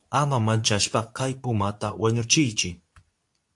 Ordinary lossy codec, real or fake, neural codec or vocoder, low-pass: AAC, 64 kbps; fake; codec, 24 kHz, 0.9 kbps, WavTokenizer, medium speech release version 2; 10.8 kHz